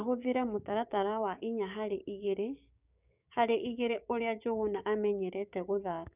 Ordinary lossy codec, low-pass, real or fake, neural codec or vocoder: none; 3.6 kHz; fake; codec, 16 kHz, 6 kbps, DAC